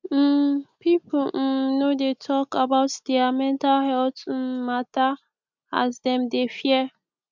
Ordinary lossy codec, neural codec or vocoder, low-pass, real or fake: none; none; 7.2 kHz; real